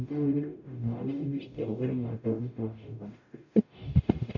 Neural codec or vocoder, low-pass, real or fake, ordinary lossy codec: codec, 44.1 kHz, 0.9 kbps, DAC; 7.2 kHz; fake; none